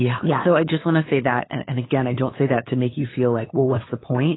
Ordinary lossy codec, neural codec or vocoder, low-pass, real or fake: AAC, 16 kbps; codec, 16 kHz, 8 kbps, FunCodec, trained on LibriTTS, 25 frames a second; 7.2 kHz; fake